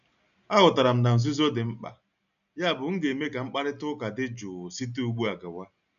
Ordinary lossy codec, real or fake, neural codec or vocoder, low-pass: none; real; none; 7.2 kHz